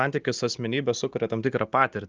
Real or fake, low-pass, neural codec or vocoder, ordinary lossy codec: real; 7.2 kHz; none; Opus, 24 kbps